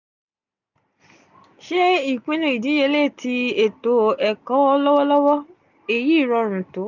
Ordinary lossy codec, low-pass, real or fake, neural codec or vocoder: none; 7.2 kHz; real; none